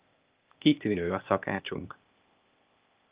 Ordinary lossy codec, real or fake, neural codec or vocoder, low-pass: Opus, 32 kbps; fake; codec, 16 kHz, 0.8 kbps, ZipCodec; 3.6 kHz